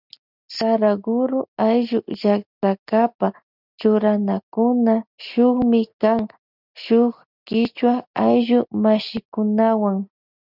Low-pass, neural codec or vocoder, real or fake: 5.4 kHz; none; real